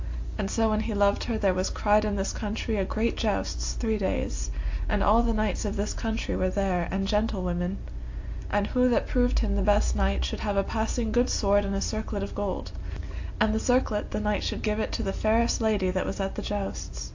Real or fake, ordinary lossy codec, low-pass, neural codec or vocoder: real; AAC, 48 kbps; 7.2 kHz; none